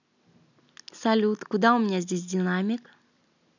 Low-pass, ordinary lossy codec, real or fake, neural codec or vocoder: 7.2 kHz; none; real; none